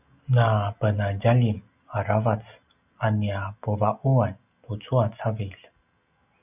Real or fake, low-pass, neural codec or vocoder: real; 3.6 kHz; none